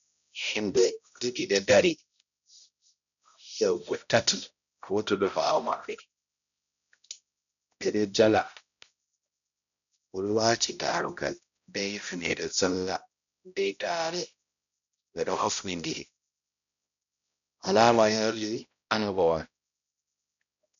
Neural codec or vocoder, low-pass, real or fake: codec, 16 kHz, 0.5 kbps, X-Codec, HuBERT features, trained on balanced general audio; 7.2 kHz; fake